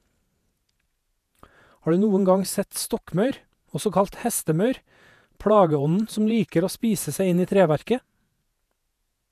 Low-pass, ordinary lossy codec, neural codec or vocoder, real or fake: 14.4 kHz; none; vocoder, 48 kHz, 128 mel bands, Vocos; fake